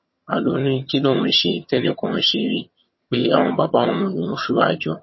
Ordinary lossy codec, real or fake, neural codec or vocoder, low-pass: MP3, 24 kbps; fake; vocoder, 22.05 kHz, 80 mel bands, HiFi-GAN; 7.2 kHz